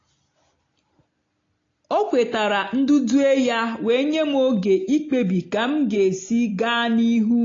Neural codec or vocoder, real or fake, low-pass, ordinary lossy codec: none; real; 7.2 kHz; AAC, 32 kbps